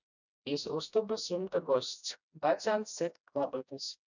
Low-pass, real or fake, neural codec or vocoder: 7.2 kHz; fake; codec, 16 kHz, 1 kbps, FreqCodec, smaller model